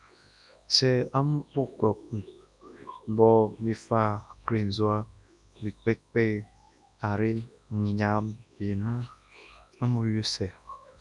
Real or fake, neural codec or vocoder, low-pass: fake; codec, 24 kHz, 0.9 kbps, WavTokenizer, large speech release; 10.8 kHz